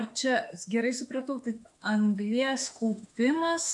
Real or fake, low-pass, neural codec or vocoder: fake; 10.8 kHz; autoencoder, 48 kHz, 32 numbers a frame, DAC-VAE, trained on Japanese speech